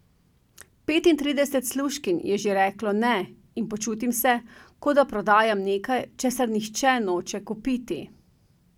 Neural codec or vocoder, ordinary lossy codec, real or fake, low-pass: none; none; real; 19.8 kHz